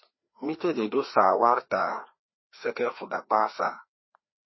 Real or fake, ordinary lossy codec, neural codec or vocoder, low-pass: fake; MP3, 24 kbps; codec, 16 kHz, 2 kbps, FreqCodec, larger model; 7.2 kHz